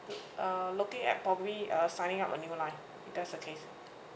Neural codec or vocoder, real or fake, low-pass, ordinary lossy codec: none; real; none; none